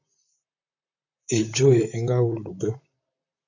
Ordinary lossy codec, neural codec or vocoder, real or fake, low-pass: AAC, 48 kbps; vocoder, 44.1 kHz, 128 mel bands, Pupu-Vocoder; fake; 7.2 kHz